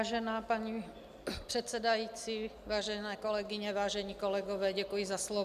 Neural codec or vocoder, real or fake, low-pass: none; real; 14.4 kHz